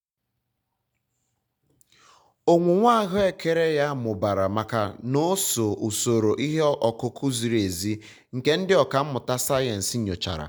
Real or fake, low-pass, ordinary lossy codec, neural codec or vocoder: real; none; none; none